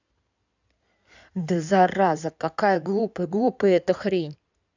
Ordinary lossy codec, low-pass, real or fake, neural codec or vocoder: none; 7.2 kHz; fake; codec, 16 kHz in and 24 kHz out, 2.2 kbps, FireRedTTS-2 codec